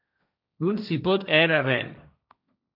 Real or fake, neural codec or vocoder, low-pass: fake; codec, 16 kHz, 1.1 kbps, Voila-Tokenizer; 5.4 kHz